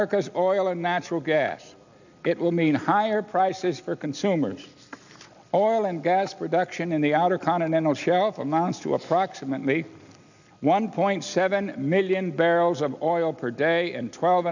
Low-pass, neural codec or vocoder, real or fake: 7.2 kHz; none; real